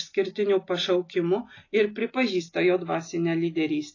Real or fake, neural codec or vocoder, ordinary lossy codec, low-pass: real; none; AAC, 32 kbps; 7.2 kHz